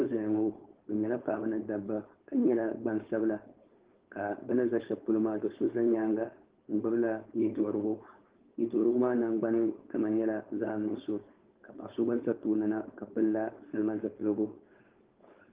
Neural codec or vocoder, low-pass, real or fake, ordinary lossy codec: codec, 16 kHz, 4.8 kbps, FACodec; 3.6 kHz; fake; Opus, 16 kbps